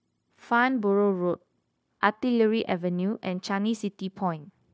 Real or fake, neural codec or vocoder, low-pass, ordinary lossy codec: fake; codec, 16 kHz, 0.9 kbps, LongCat-Audio-Codec; none; none